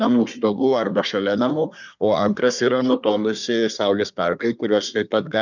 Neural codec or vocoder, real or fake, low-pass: codec, 24 kHz, 1 kbps, SNAC; fake; 7.2 kHz